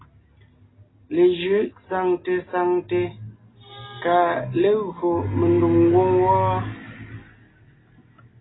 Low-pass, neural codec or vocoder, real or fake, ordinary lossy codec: 7.2 kHz; none; real; AAC, 16 kbps